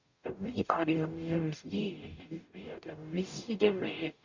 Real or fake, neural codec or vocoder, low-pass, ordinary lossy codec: fake; codec, 44.1 kHz, 0.9 kbps, DAC; 7.2 kHz; Opus, 64 kbps